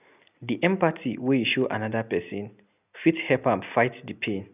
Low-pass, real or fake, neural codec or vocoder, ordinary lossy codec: 3.6 kHz; real; none; none